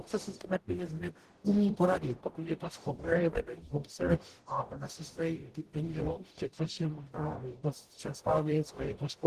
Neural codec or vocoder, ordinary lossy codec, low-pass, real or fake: codec, 44.1 kHz, 0.9 kbps, DAC; Opus, 16 kbps; 14.4 kHz; fake